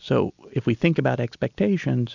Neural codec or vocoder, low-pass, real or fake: none; 7.2 kHz; real